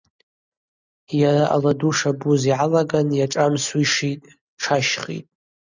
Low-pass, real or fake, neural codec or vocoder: 7.2 kHz; real; none